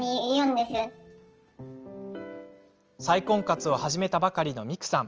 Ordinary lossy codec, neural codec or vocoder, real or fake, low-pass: Opus, 24 kbps; none; real; 7.2 kHz